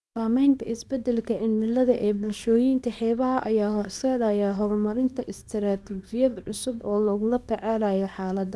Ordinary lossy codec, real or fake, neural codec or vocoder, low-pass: none; fake; codec, 24 kHz, 0.9 kbps, WavTokenizer, small release; none